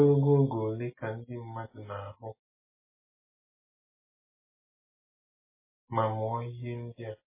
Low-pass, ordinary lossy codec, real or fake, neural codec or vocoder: 3.6 kHz; MP3, 16 kbps; real; none